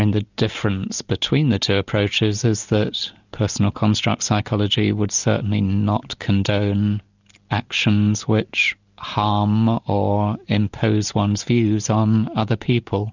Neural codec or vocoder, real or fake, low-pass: none; real; 7.2 kHz